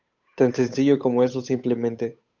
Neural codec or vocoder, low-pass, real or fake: codec, 16 kHz, 8 kbps, FunCodec, trained on Chinese and English, 25 frames a second; 7.2 kHz; fake